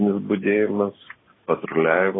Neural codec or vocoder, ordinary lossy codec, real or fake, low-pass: none; AAC, 16 kbps; real; 7.2 kHz